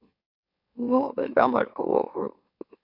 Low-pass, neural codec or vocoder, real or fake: 5.4 kHz; autoencoder, 44.1 kHz, a latent of 192 numbers a frame, MeloTTS; fake